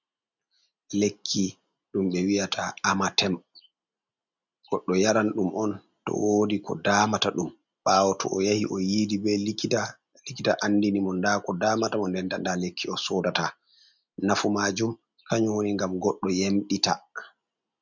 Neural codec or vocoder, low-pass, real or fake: none; 7.2 kHz; real